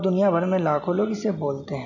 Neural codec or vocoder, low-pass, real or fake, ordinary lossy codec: none; 7.2 kHz; real; none